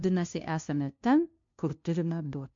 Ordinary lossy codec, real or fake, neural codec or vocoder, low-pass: MP3, 48 kbps; fake; codec, 16 kHz, 0.5 kbps, FunCodec, trained on LibriTTS, 25 frames a second; 7.2 kHz